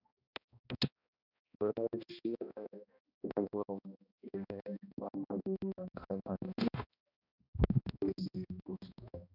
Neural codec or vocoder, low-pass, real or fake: codec, 16 kHz, 1 kbps, X-Codec, HuBERT features, trained on balanced general audio; 5.4 kHz; fake